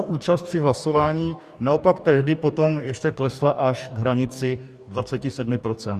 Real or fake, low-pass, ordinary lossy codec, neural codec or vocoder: fake; 14.4 kHz; Opus, 64 kbps; codec, 44.1 kHz, 2.6 kbps, DAC